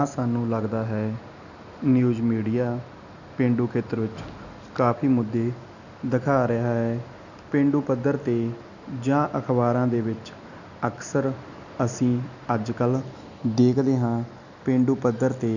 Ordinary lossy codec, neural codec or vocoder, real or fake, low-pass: none; none; real; 7.2 kHz